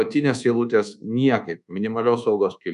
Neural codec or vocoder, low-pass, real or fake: codec, 24 kHz, 1.2 kbps, DualCodec; 10.8 kHz; fake